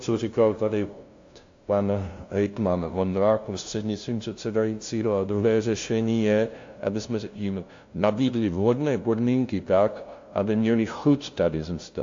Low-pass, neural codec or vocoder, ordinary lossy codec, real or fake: 7.2 kHz; codec, 16 kHz, 0.5 kbps, FunCodec, trained on LibriTTS, 25 frames a second; AAC, 48 kbps; fake